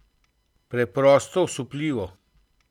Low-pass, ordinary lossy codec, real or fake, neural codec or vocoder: 19.8 kHz; none; real; none